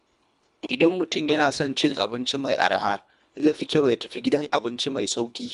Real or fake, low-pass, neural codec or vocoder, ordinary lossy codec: fake; 10.8 kHz; codec, 24 kHz, 1.5 kbps, HILCodec; none